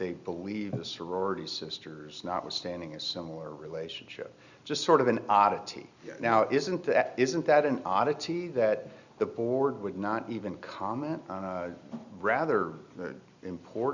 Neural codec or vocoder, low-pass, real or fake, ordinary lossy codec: none; 7.2 kHz; real; Opus, 64 kbps